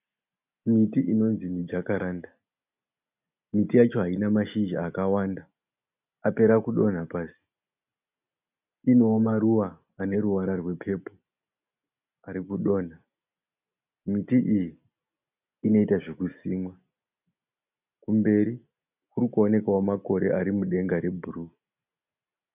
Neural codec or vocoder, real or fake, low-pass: none; real; 3.6 kHz